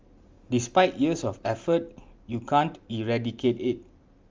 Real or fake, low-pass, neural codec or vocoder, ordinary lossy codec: real; 7.2 kHz; none; Opus, 32 kbps